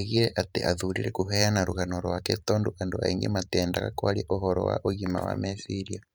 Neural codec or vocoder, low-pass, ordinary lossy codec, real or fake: vocoder, 44.1 kHz, 128 mel bands every 512 samples, BigVGAN v2; none; none; fake